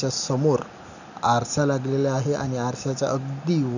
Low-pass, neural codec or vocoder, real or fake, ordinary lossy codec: 7.2 kHz; none; real; none